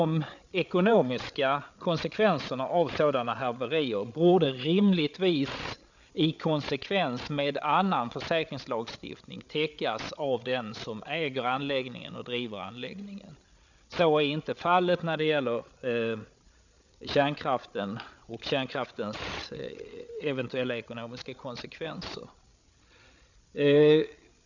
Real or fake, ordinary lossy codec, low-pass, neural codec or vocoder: fake; none; 7.2 kHz; codec, 16 kHz, 8 kbps, FreqCodec, larger model